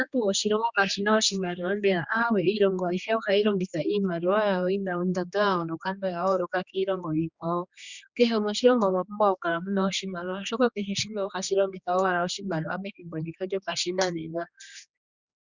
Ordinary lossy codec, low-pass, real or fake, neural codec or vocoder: Opus, 64 kbps; 7.2 kHz; fake; codec, 16 kHz, 2 kbps, X-Codec, HuBERT features, trained on general audio